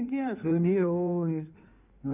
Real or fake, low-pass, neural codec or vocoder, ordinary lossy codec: fake; 3.6 kHz; codec, 16 kHz in and 24 kHz out, 1.1 kbps, FireRedTTS-2 codec; none